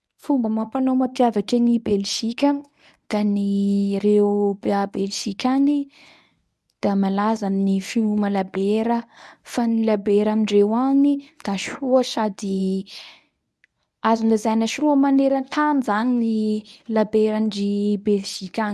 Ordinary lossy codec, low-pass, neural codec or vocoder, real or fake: none; none; codec, 24 kHz, 0.9 kbps, WavTokenizer, medium speech release version 1; fake